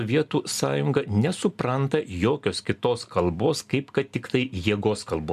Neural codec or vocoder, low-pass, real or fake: none; 14.4 kHz; real